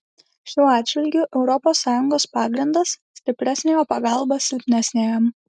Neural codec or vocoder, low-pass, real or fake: none; 10.8 kHz; real